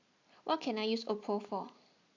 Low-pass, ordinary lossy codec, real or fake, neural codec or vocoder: 7.2 kHz; AAC, 48 kbps; real; none